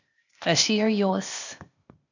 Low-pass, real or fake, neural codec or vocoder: 7.2 kHz; fake; codec, 16 kHz, 0.8 kbps, ZipCodec